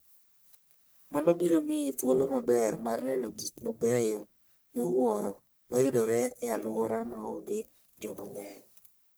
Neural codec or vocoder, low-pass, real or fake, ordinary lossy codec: codec, 44.1 kHz, 1.7 kbps, Pupu-Codec; none; fake; none